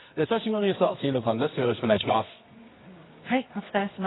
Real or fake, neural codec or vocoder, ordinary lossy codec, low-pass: fake; codec, 24 kHz, 0.9 kbps, WavTokenizer, medium music audio release; AAC, 16 kbps; 7.2 kHz